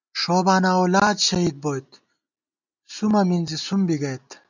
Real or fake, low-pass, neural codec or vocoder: real; 7.2 kHz; none